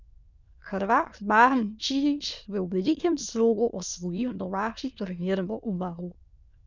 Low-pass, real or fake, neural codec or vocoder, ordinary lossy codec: 7.2 kHz; fake; autoencoder, 22.05 kHz, a latent of 192 numbers a frame, VITS, trained on many speakers; none